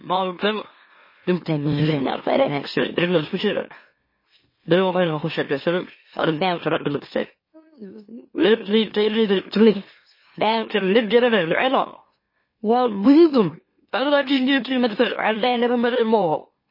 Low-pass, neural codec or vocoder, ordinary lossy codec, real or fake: 5.4 kHz; autoencoder, 44.1 kHz, a latent of 192 numbers a frame, MeloTTS; MP3, 24 kbps; fake